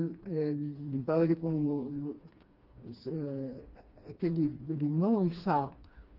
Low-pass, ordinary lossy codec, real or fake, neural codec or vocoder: 5.4 kHz; Opus, 24 kbps; fake; codec, 16 kHz, 2 kbps, FreqCodec, smaller model